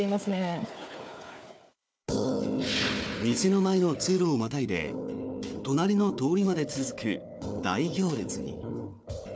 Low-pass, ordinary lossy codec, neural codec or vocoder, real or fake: none; none; codec, 16 kHz, 4 kbps, FunCodec, trained on Chinese and English, 50 frames a second; fake